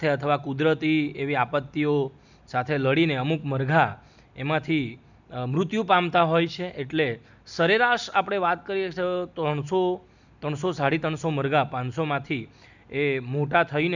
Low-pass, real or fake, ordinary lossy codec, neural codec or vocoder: 7.2 kHz; real; none; none